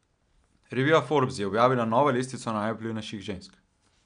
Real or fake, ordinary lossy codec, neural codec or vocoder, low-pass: real; none; none; 9.9 kHz